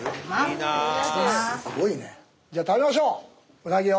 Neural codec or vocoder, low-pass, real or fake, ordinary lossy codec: none; none; real; none